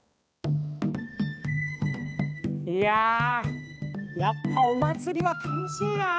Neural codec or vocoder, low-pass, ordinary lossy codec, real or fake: codec, 16 kHz, 2 kbps, X-Codec, HuBERT features, trained on balanced general audio; none; none; fake